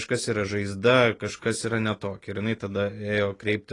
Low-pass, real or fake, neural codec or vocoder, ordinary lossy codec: 10.8 kHz; real; none; AAC, 32 kbps